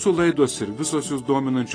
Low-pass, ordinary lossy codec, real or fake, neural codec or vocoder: 9.9 kHz; AAC, 32 kbps; real; none